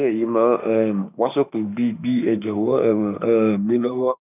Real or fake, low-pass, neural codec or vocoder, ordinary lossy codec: fake; 3.6 kHz; autoencoder, 48 kHz, 32 numbers a frame, DAC-VAE, trained on Japanese speech; none